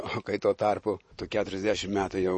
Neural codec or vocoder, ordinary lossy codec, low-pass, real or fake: vocoder, 44.1 kHz, 128 mel bands every 512 samples, BigVGAN v2; MP3, 32 kbps; 10.8 kHz; fake